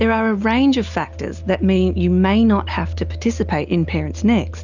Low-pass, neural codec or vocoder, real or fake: 7.2 kHz; none; real